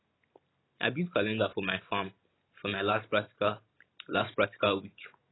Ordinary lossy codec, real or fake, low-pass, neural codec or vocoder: AAC, 16 kbps; real; 7.2 kHz; none